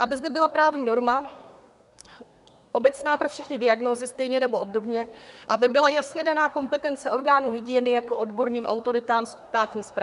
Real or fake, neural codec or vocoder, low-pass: fake; codec, 24 kHz, 1 kbps, SNAC; 10.8 kHz